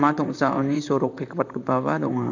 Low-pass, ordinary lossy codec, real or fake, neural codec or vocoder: 7.2 kHz; none; fake; vocoder, 44.1 kHz, 128 mel bands, Pupu-Vocoder